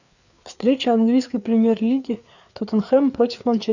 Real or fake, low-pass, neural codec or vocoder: fake; 7.2 kHz; codec, 16 kHz, 4 kbps, FreqCodec, larger model